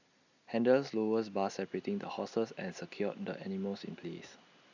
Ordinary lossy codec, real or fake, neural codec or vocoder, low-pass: none; real; none; 7.2 kHz